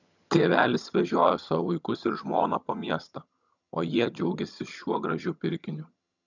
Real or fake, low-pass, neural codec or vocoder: fake; 7.2 kHz; vocoder, 22.05 kHz, 80 mel bands, HiFi-GAN